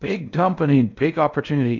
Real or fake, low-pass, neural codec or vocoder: fake; 7.2 kHz; codec, 16 kHz in and 24 kHz out, 0.6 kbps, FocalCodec, streaming, 4096 codes